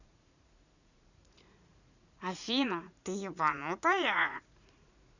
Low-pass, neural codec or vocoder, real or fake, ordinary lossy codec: 7.2 kHz; vocoder, 44.1 kHz, 80 mel bands, Vocos; fake; none